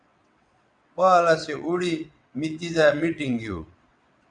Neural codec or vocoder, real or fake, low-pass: vocoder, 22.05 kHz, 80 mel bands, WaveNeXt; fake; 9.9 kHz